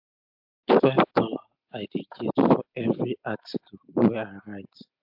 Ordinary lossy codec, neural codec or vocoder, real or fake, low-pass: AAC, 48 kbps; none; real; 5.4 kHz